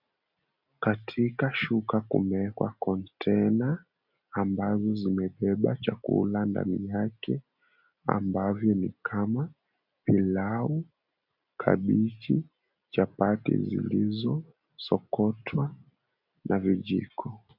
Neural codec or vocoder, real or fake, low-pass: none; real; 5.4 kHz